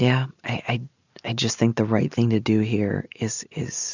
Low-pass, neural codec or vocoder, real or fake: 7.2 kHz; none; real